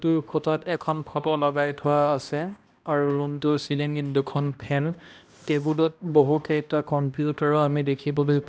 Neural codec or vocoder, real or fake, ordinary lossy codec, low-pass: codec, 16 kHz, 1 kbps, X-Codec, HuBERT features, trained on balanced general audio; fake; none; none